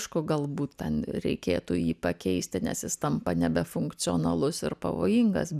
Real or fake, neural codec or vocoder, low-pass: real; none; 14.4 kHz